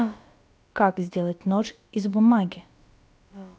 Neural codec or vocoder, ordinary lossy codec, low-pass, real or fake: codec, 16 kHz, about 1 kbps, DyCAST, with the encoder's durations; none; none; fake